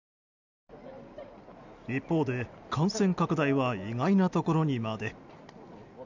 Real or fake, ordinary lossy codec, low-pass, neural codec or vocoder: real; none; 7.2 kHz; none